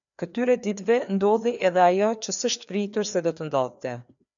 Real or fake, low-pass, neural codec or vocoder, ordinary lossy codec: fake; 7.2 kHz; codec, 16 kHz, 2 kbps, FreqCodec, larger model; MP3, 96 kbps